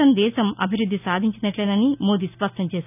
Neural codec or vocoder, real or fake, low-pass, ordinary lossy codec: none; real; 3.6 kHz; none